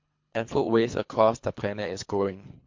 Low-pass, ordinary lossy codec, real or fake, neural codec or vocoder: 7.2 kHz; MP3, 48 kbps; fake; codec, 24 kHz, 3 kbps, HILCodec